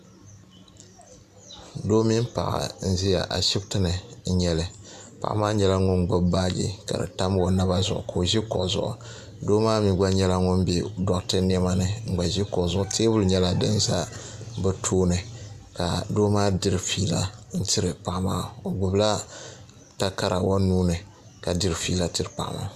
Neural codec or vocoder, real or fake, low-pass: none; real; 14.4 kHz